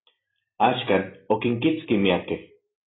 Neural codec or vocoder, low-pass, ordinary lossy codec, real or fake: none; 7.2 kHz; AAC, 16 kbps; real